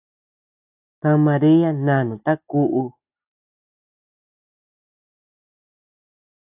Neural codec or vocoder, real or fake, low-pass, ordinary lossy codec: none; real; 3.6 kHz; AAC, 32 kbps